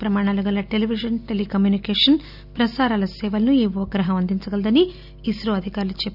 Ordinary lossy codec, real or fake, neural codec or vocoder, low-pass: none; real; none; 5.4 kHz